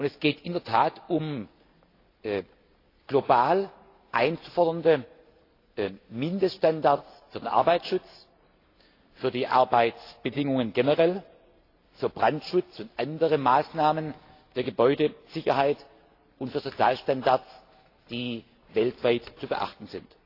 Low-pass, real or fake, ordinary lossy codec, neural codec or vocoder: 5.4 kHz; real; AAC, 32 kbps; none